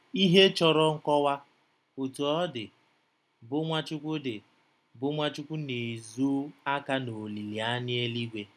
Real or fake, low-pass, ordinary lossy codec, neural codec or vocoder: real; none; none; none